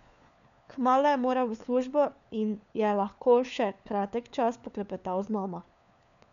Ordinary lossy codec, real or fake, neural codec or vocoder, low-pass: none; fake; codec, 16 kHz, 4 kbps, FunCodec, trained on LibriTTS, 50 frames a second; 7.2 kHz